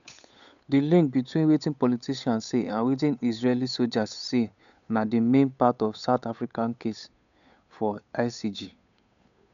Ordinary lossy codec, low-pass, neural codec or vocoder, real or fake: none; 7.2 kHz; codec, 16 kHz, 8 kbps, FunCodec, trained on Chinese and English, 25 frames a second; fake